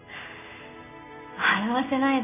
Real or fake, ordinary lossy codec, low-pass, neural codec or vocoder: real; none; 3.6 kHz; none